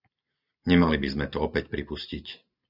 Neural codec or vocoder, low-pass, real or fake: none; 5.4 kHz; real